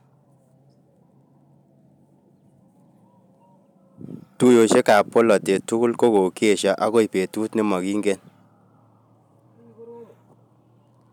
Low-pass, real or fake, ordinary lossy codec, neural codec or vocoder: 19.8 kHz; real; none; none